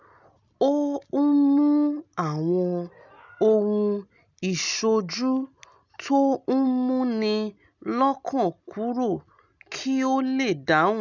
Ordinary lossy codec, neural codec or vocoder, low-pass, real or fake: none; none; 7.2 kHz; real